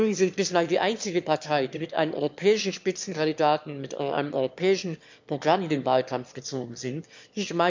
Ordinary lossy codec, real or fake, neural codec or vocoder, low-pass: MP3, 64 kbps; fake; autoencoder, 22.05 kHz, a latent of 192 numbers a frame, VITS, trained on one speaker; 7.2 kHz